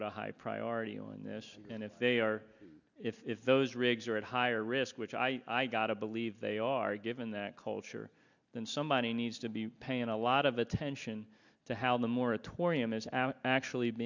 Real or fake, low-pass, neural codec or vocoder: real; 7.2 kHz; none